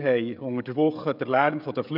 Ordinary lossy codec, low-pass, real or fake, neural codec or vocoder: none; 5.4 kHz; fake; codec, 16 kHz, 16 kbps, FreqCodec, smaller model